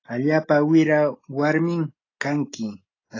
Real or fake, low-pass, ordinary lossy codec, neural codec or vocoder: real; 7.2 kHz; AAC, 32 kbps; none